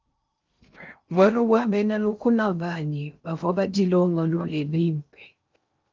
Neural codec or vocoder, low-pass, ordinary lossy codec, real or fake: codec, 16 kHz in and 24 kHz out, 0.6 kbps, FocalCodec, streaming, 2048 codes; 7.2 kHz; Opus, 24 kbps; fake